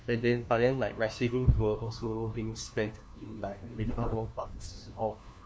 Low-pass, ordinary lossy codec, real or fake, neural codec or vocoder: none; none; fake; codec, 16 kHz, 1 kbps, FunCodec, trained on LibriTTS, 50 frames a second